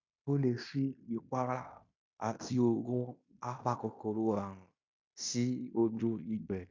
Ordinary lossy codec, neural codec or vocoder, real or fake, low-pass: MP3, 64 kbps; codec, 16 kHz in and 24 kHz out, 0.9 kbps, LongCat-Audio-Codec, fine tuned four codebook decoder; fake; 7.2 kHz